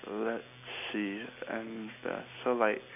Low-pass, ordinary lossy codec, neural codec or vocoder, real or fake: 3.6 kHz; none; none; real